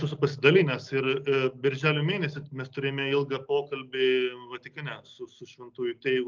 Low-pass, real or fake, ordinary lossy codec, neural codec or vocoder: 7.2 kHz; real; Opus, 24 kbps; none